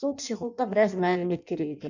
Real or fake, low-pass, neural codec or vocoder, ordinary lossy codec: fake; 7.2 kHz; codec, 16 kHz in and 24 kHz out, 0.6 kbps, FireRedTTS-2 codec; none